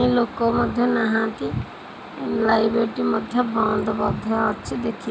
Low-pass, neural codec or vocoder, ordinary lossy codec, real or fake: none; none; none; real